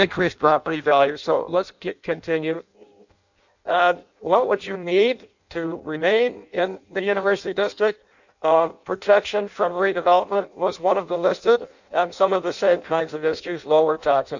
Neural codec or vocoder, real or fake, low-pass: codec, 16 kHz in and 24 kHz out, 0.6 kbps, FireRedTTS-2 codec; fake; 7.2 kHz